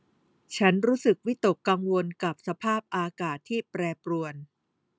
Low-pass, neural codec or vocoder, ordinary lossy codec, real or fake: none; none; none; real